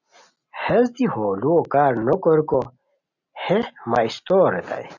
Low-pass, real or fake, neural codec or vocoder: 7.2 kHz; real; none